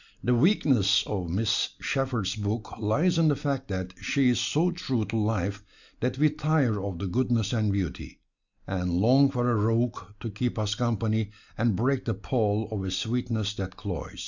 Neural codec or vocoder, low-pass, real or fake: none; 7.2 kHz; real